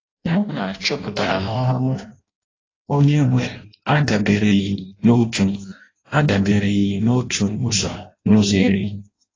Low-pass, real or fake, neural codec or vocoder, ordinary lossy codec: 7.2 kHz; fake; codec, 16 kHz in and 24 kHz out, 0.6 kbps, FireRedTTS-2 codec; AAC, 32 kbps